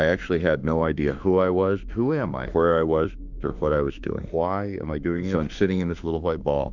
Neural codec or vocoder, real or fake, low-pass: autoencoder, 48 kHz, 32 numbers a frame, DAC-VAE, trained on Japanese speech; fake; 7.2 kHz